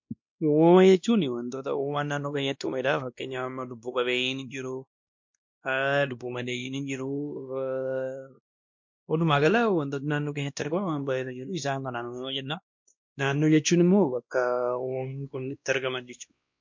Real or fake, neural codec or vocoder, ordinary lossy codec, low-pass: fake; codec, 16 kHz, 1 kbps, X-Codec, WavLM features, trained on Multilingual LibriSpeech; MP3, 48 kbps; 7.2 kHz